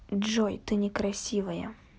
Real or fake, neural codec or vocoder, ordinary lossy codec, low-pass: real; none; none; none